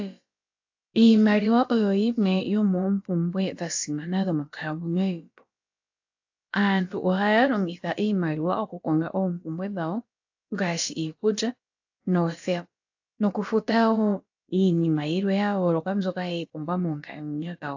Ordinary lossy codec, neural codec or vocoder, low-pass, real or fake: AAC, 48 kbps; codec, 16 kHz, about 1 kbps, DyCAST, with the encoder's durations; 7.2 kHz; fake